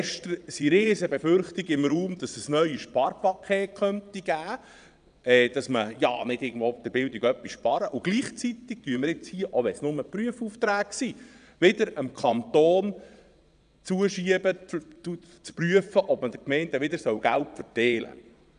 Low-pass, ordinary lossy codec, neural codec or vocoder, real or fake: 9.9 kHz; none; vocoder, 22.05 kHz, 80 mel bands, Vocos; fake